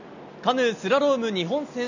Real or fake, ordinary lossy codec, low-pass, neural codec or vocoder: real; none; 7.2 kHz; none